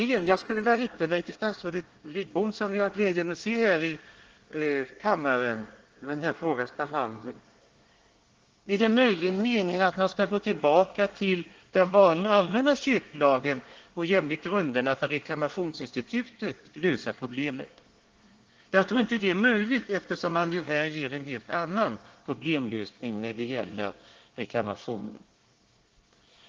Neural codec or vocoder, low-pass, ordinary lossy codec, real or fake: codec, 24 kHz, 1 kbps, SNAC; 7.2 kHz; Opus, 16 kbps; fake